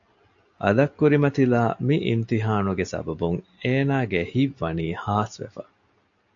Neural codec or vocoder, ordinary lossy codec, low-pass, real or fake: none; AAC, 64 kbps; 7.2 kHz; real